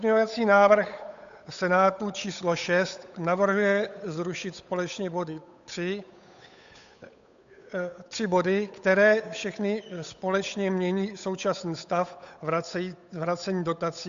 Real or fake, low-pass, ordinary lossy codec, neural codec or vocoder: fake; 7.2 kHz; AAC, 96 kbps; codec, 16 kHz, 8 kbps, FunCodec, trained on Chinese and English, 25 frames a second